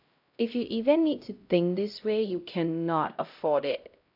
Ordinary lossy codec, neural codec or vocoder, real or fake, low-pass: AAC, 48 kbps; codec, 16 kHz, 0.5 kbps, X-Codec, HuBERT features, trained on LibriSpeech; fake; 5.4 kHz